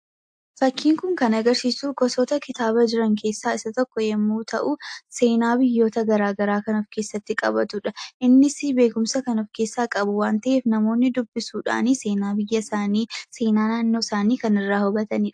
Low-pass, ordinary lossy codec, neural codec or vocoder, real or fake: 9.9 kHz; AAC, 64 kbps; none; real